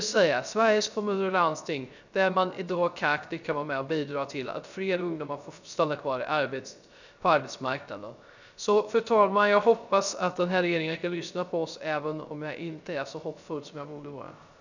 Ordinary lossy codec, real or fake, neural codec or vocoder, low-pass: none; fake; codec, 16 kHz, 0.3 kbps, FocalCodec; 7.2 kHz